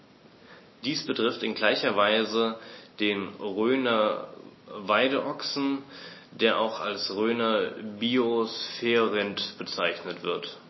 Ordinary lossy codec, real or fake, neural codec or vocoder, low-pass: MP3, 24 kbps; real; none; 7.2 kHz